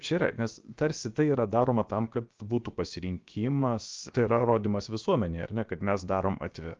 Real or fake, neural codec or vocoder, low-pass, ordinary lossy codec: fake; codec, 16 kHz, about 1 kbps, DyCAST, with the encoder's durations; 7.2 kHz; Opus, 32 kbps